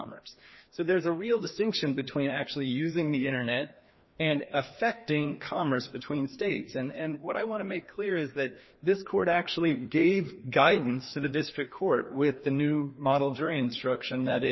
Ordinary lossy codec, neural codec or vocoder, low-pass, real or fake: MP3, 24 kbps; codec, 16 kHz, 2 kbps, FreqCodec, larger model; 7.2 kHz; fake